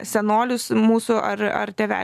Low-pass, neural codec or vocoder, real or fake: 14.4 kHz; none; real